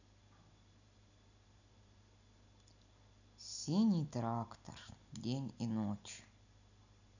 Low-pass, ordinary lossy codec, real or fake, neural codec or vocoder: 7.2 kHz; none; real; none